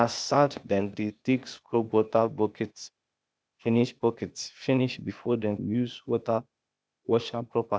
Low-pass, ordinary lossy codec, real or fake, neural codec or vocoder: none; none; fake; codec, 16 kHz, 0.8 kbps, ZipCodec